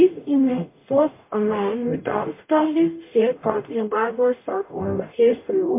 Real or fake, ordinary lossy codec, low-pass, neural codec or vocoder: fake; MP3, 24 kbps; 3.6 kHz; codec, 44.1 kHz, 0.9 kbps, DAC